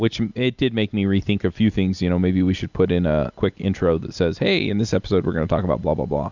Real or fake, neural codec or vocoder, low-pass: real; none; 7.2 kHz